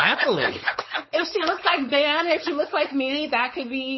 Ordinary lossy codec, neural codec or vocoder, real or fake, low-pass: MP3, 24 kbps; codec, 16 kHz, 4.8 kbps, FACodec; fake; 7.2 kHz